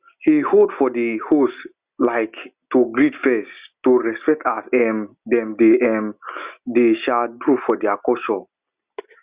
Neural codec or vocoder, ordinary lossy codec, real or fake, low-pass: none; Opus, 64 kbps; real; 3.6 kHz